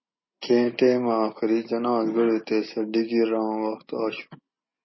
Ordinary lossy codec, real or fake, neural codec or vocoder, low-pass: MP3, 24 kbps; real; none; 7.2 kHz